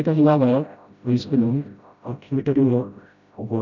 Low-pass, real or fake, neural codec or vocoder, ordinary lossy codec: 7.2 kHz; fake; codec, 16 kHz, 0.5 kbps, FreqCodec, smaller model; none